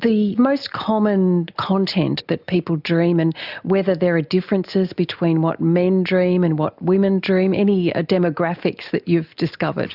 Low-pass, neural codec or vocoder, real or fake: 5.4 kHz; none; real